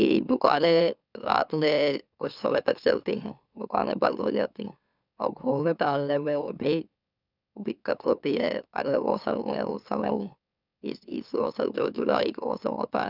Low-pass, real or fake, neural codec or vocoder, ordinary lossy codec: 5.4 kHz; fake; autoencoder, 44.1 kHz, a latent of 192 numbers a frame, MeloTTS; none